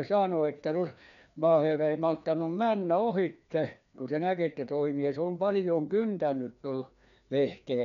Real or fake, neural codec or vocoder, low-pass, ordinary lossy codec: fake; codec, 16 kHz, 2 kbps, FreqCodec, larger model; 7.2 kHz; none